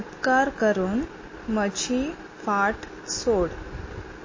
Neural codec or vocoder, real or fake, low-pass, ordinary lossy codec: none; real; 7.2 kHz; MP3, 32 kbps